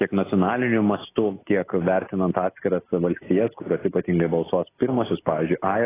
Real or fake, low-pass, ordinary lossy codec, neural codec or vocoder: real; 3.6 kHz; AAC, 16 kbps; none